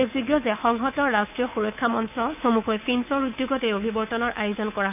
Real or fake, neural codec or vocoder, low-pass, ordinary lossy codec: fake; codec, 24 kHz, 3.1 kbps, DualCodec; 3.6 kHz; none